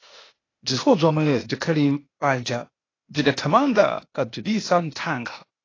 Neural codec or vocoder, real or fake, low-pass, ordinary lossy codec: codec, 16 kHz, 0.8 kbps, ZipCodec; fake; 7.2 kHz; AAC, 32 kbps